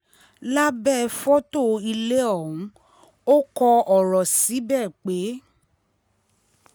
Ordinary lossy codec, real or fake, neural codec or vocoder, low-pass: none; real; none; none